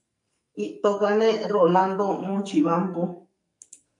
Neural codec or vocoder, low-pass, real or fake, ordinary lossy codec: codec, 44.1 kHz, 2.6 kbps, SNAC; 10.8 kHz; fake; MP3, 64 kbps